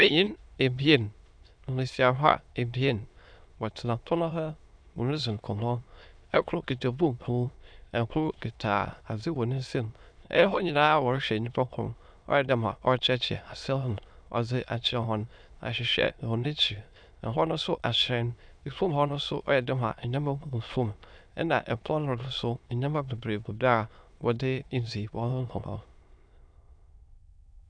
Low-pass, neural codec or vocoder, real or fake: 9.9 kHz; autoencoder, 22.05 kHz, a latent of 192 numbers a frame, VITS, trained on many speakers; fake